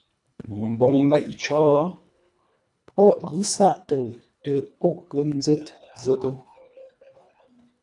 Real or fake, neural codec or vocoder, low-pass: fake; codec, 24 kHz, 1.5 kbps, HILCodec; 10.8 kHz